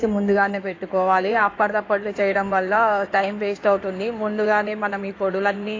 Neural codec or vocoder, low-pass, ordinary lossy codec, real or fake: codec, 16 kHz in and 24 kHz out, 2.2 kbps, FireRedTTS-2 codec; 7.2 kHz; AAC, 32 kbps; fake